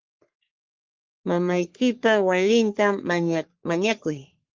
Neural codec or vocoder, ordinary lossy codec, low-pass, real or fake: codec, 44.1 kHz, 3.4 kbps, Pupu-Codec; Opus, 32 kbps; 7.2 kHz; fake